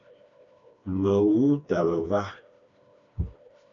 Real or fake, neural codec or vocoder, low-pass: fake; codec, 16 kHz, 2 kbps, FreqCodec, smaller model; 7.2 kHz